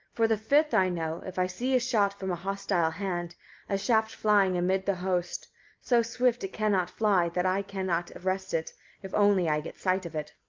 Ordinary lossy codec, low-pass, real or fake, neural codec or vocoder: Opus, 24 kbps; 7.2 kHz; real; none